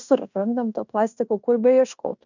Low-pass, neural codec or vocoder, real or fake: 7.2 kHz; codec, 16 kHz in and 24 kHz out, 0.9 kbps, LongCat-Audio-Codec, fine tuned four codebook decoder; fake